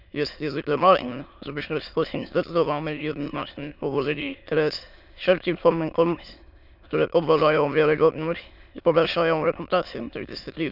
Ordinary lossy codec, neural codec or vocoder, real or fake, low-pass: none; autoencoder, 22.05 kHz, a latent of 192 numbers a frame, VITS, trained on many speakers; fake; 5.4 kHz